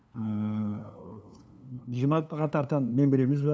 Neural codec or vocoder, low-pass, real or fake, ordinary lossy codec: codec, 16 kHz, 2 kbps, FunCodec, trained on LibriTTS, 25 frames a second; none; fake; none